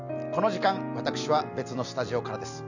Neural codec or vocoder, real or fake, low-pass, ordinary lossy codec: none; real; 7.2 kHz; none